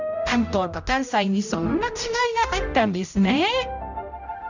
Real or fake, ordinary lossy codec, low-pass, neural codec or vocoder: fake; none; 7.2 kHz; codec, 16 kHz, 0.5 kbps, X-Codec, HuBERT features, trained on general audio